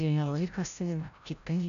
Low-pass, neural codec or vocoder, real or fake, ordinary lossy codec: 7.2 kHz; codec, 16 kHz, 0.5 kbps, FreqCodec, larger model; fake; AAC, 96 kbps